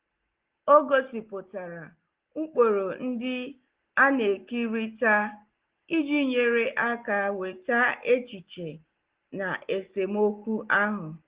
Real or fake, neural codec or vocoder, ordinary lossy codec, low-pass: real; none; Opus, 16 kbps; 3.6 kHz